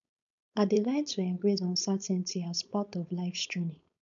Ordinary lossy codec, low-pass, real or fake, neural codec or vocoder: none; 7.2 kHz; fake; codec, 16 kHz, 4.8 kbps, FACodec